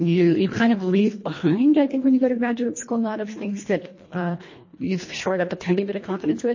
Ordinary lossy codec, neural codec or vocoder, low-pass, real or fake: MP3, 32 kbps; codec, 24 kHz, 1.5 kbps, HILCodec; 7.2 kHz; fake